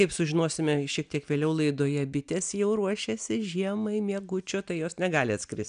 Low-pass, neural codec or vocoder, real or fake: 9.9 kHz; none; real